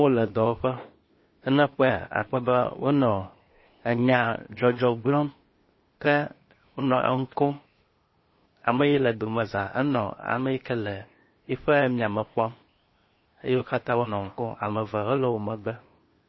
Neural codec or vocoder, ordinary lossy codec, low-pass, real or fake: codec, 16 kHz, 0.8 kbps, ZipCodec; MP3, 24 kbps; 7.2 kHz; fake